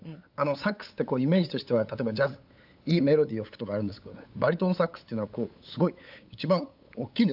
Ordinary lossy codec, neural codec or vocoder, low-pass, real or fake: AAC, 48 kbps; codec, 16 kHz, 8 kbps, FunCodec, trained on LibriTTS, 25 frames a second; 5.4 kHz; fake